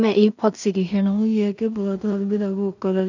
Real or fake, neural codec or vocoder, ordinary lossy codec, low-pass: fake; codec, 16 kHz in and 24 kHz out, 0.4 kbps, LongCat-Audio-Codec, two codebook decoder; none; 7.2 kHz